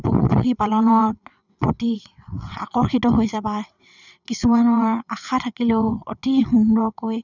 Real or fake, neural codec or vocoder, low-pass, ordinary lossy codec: fake; vocoder, 22.05 kHz, 80 mel bands, WaveNeXt; 7.2 kHz; none